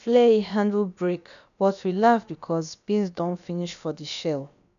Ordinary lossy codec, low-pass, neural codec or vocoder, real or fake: none; 7.2 kHz; codec, 16 kHz, about 1 kbps, DyCAST, with the encoder's durations; fake